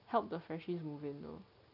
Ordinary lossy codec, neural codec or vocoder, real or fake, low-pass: none; none; real; 5.4 kHz